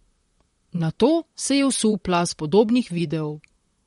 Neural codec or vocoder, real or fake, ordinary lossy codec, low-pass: vocoder, 44.1 kHz, 128 mel bands, Pupu-Vocoder; fake; MP3, 48 kbps; 19.8 kHz